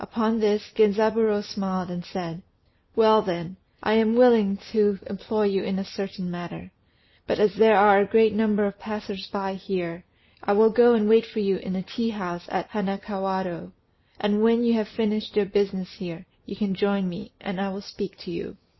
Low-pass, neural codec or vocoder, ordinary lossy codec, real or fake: 7.2 kHz; none; MP3, 24 kbps; real